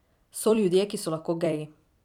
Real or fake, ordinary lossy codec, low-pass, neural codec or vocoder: fake; none; 19.8 kHz; vocoder, 44.1 kHz, 128 mel bands every 256 samples, BigVGAN v2